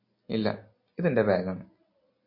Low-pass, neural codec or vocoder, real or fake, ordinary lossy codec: 5.4 kHz; none; real; MP3, 32 kbps